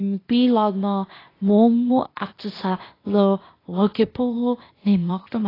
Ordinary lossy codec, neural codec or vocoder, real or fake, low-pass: AAC, 24 kbps; codec, 16 kHz, 0.8 kbps, ZipCodec; fake; 5.4 kHz